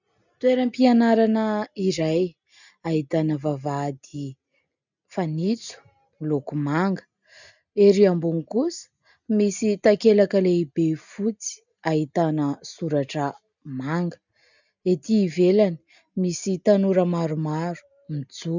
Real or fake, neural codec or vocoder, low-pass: real; none; 7.2 kHz